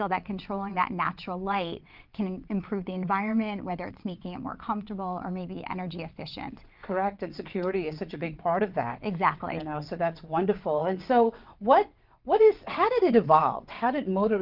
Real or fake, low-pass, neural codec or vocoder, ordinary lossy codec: fake; 5.4 kHz; vocoder, 22.05 kHz, 80 mel bands, WaveNeXt; Opus, 32 kbps